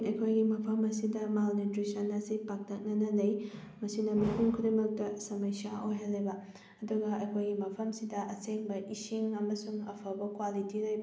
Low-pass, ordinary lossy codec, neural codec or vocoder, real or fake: none; none; none; real